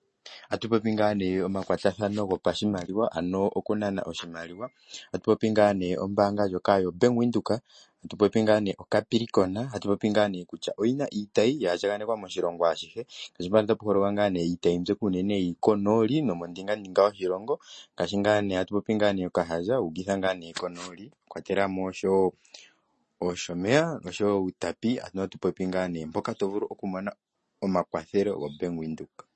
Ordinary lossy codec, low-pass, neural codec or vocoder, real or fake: MP3, 32 kbps; 10.8 kHz; none; real